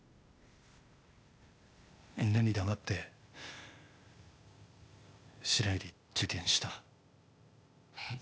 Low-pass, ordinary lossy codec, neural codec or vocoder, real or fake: none; none; codec, 16 kHz, 0.8 kbps, ZipCodec; fake